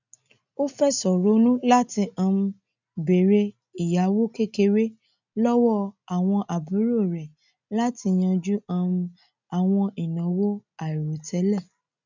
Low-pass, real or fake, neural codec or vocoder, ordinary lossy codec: 7.2 kHz; real; none; none